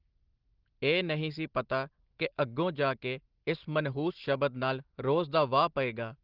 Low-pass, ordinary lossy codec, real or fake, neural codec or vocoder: 5.4 kHz; Opus, 16 kbps; real; none